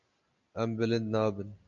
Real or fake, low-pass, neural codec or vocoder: real; 7.2 kHz; none